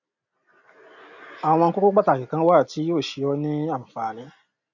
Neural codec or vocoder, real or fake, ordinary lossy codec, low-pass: none; real; none; 7.2 kHz